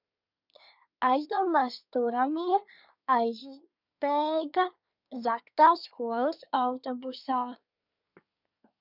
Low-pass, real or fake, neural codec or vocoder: 5.4 kHz; fake; codec, 24 kHz, 1 kbps, SNAC